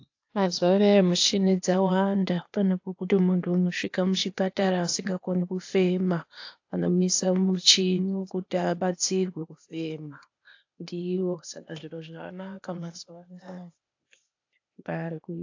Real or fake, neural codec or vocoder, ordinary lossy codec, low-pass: fake; codec, 16 kHz, 0.8 kbps, ZipCodec; AAC, 48 kbps; 7.2 kHz